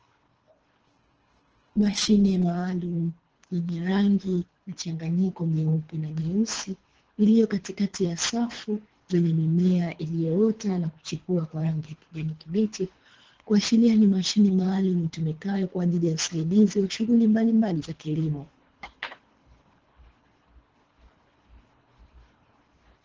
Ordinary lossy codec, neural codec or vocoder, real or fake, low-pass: Opus, 16 kbps; codec, 24 kHz, 3 kbps, HILCodec; fake; 7.2 kHz